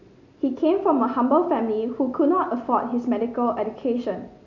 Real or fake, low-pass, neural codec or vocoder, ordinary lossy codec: real; 7.2 kHz; none; none